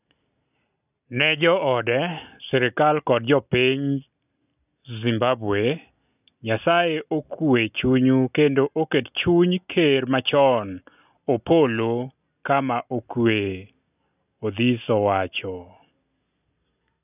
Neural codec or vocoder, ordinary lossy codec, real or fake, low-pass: none; none; real; 3.6 kHz